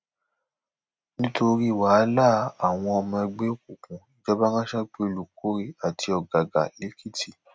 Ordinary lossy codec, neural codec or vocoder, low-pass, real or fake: none; none; none; real